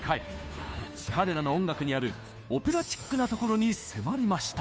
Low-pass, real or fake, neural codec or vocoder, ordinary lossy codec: none; fake; codec, 16 kHz, 2 kbps, FunCodec, trained on Chinese and English, 25 frames a second; none